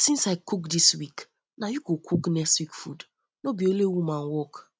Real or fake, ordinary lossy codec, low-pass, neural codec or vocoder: real; none; none; none